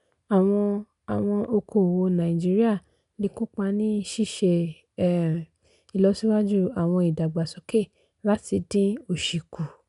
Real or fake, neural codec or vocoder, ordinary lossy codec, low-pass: real; none; none; 10.8 kHz